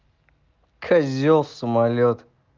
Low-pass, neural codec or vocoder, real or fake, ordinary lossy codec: 7.2 kHz; none; real; Opus, 32 kbps